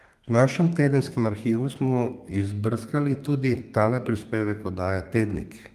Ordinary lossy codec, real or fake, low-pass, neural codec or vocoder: Opus, 32 kbps; fake; 14.4 kHz; codec, 32 kHz, 1.9 kbps, SNAC